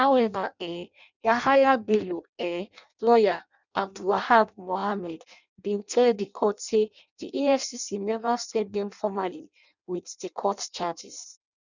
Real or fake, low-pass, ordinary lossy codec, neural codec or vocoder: fake; 7.2 kHz; none; codec, 16 kHz in and 24 kHz out, 0.6 kbps, FireRedTTS-2 codec